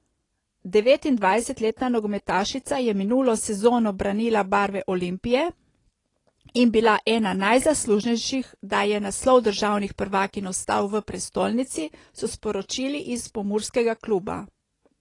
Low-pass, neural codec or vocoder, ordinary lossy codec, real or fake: 10.8 kHz; none; AAC, 32 kbps; real